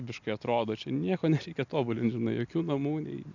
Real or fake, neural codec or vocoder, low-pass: real; none; 7.2 kHz